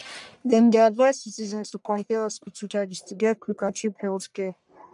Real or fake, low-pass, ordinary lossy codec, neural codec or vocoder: fake; 10.8 kHz; none; codec, 44.1 kHz, 1.7 kbps, Pupu-Codec